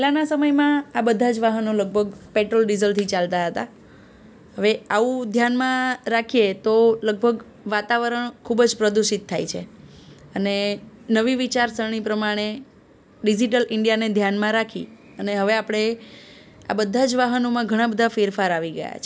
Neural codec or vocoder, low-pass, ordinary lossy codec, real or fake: none; none; none; real